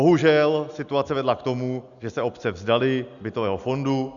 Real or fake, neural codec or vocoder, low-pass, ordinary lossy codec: real; none; 7.2 kHz; MP3, 96 kbps